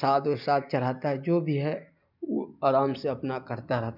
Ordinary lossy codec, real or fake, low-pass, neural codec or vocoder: none; real; 5.4 kHz; none